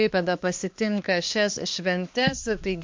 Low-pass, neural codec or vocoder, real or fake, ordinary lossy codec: 7.2 kHz; codec, 16 kHz, 2 kbps, X-Codec, HuBERT features, trained on balanced general audio; fake; MP3, 48 kbps